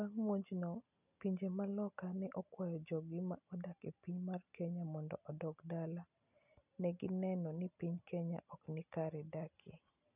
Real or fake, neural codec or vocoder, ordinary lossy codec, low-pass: real; none; none; 3.6 kHz